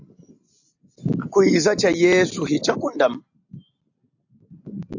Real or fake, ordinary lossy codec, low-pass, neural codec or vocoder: real; AAC, 48 kbps; 7.2 kHz; none